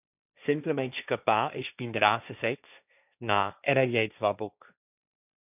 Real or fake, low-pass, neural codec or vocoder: fake; 3.6 kHz; codec, 16 kHz, 1.1 kbps, Voila-Tokenizer